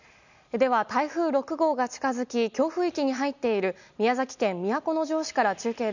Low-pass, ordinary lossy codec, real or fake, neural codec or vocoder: 7.2 kHz; none; real; none